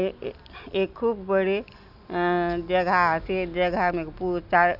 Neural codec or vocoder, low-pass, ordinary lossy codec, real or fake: none; 5.4 kHz; none; real